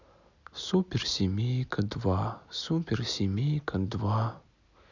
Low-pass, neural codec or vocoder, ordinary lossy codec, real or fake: 7.2 kHz; none; none; real